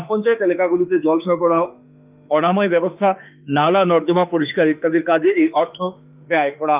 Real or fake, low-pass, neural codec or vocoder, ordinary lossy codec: fake; 3.6 kHz; autoencoder, 48 kHz, 32 numbers a frame, DAC-VAE, trained on Japanese speech; Opus, 32 kbps